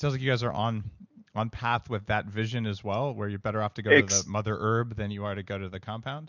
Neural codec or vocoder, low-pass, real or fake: none; 7.2 kHz; real